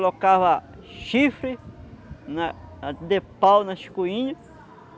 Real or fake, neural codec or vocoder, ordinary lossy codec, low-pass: real; none; none; none